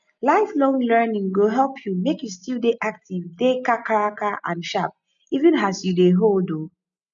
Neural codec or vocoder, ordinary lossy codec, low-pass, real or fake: none; none; 7.2 kHz; real